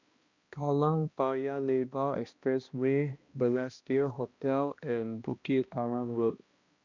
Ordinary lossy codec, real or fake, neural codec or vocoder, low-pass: Opus, 64 kbps; fake; codec, 16 kHz, 1 kbps, X-Codec, HuBERT features, trained on balanced general audio; 7.2 kHz